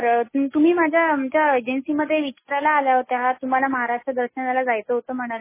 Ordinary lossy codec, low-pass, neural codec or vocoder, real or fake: MP3, 16 kbps; 3.6 kHz; none; real